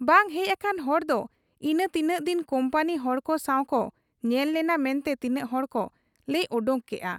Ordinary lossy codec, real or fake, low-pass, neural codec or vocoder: none; real; 19.8 kHz; none